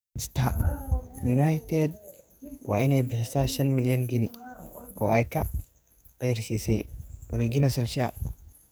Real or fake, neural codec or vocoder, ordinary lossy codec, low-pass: fake; codec, 44.1 kHz, 2.6 kbps, SNAC; none; none